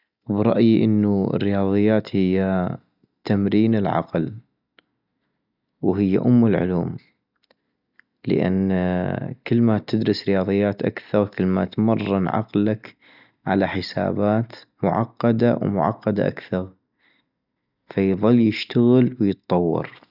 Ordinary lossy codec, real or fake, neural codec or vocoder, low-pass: none; real; none; 5.4 kHz